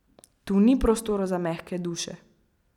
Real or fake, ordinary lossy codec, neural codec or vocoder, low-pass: real; none; none; 19.8 kHz